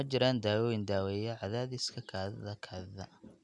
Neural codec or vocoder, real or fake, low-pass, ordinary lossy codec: none; real; 10.8 kHz; none